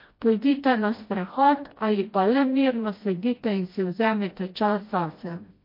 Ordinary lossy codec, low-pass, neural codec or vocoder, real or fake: MP3, 32 kbps; 5.4 kHz; codec, 16 kHz, 1 kbps, FreqCodec, smaller model; fake